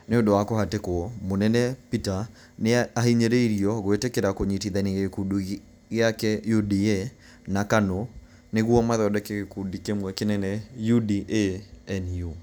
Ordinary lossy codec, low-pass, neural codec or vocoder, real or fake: none; none; none; real